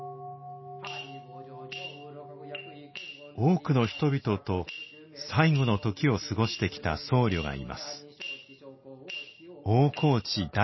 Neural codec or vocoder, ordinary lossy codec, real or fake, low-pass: none; MP3, 24 kbps; real; 7.2 kHz